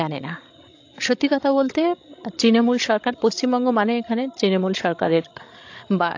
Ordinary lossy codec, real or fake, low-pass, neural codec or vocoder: AAC, 48 kbps; fake; 7.2 kHz; codec, 16 kHz, 16 kbps, FreqCodec, larger model